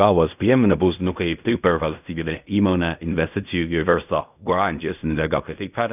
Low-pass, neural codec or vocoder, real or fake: 3.6 kHz; codec, 16 kHz in and 24 kHz out, 0.4 kbps, LongCat-Audio-Codec, fine tuned four codebook decoder; fake